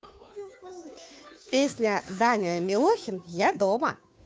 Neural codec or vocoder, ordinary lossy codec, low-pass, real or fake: codec, 16 kHz, 2 kbps, FunCodec, trained on Chinese and English, 25 frames a second; none; none; fake